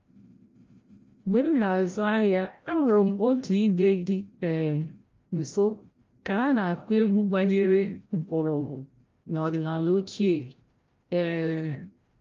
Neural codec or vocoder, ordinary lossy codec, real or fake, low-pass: codec, 16 kHz, 0.5 kbps, FreqCodec, larger model; Opus, 32 kbps; fake; 7.2 kHz